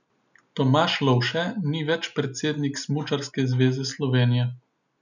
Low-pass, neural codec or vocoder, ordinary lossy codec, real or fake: 7.2 kHz; none; none; real